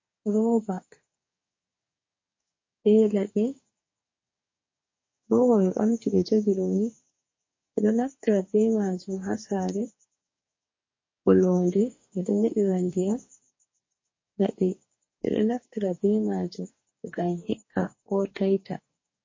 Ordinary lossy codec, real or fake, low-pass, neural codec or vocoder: MP3, 32 kbps; fake; 7.2 kHz; codec, 44.1 kHz, 2.6 kbps, DAC